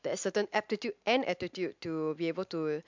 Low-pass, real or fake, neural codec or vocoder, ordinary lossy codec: 7.2 kHz; real; none; MP3, 64 kbps